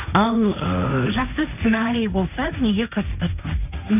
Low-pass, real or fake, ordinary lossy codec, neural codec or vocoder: 3.6 kHz; fake; none; codec, 16 kHz, 1.1 kbps, Voila-Tokenizer